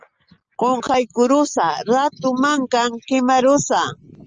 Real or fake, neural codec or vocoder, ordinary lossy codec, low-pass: real; none; Opus, 24 kbps; 7.2 kHz